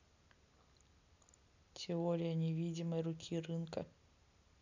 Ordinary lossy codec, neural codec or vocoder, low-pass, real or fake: none; none; 7.2 kHz; real